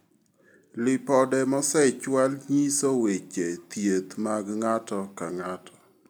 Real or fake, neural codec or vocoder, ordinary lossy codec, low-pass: real; none; none; none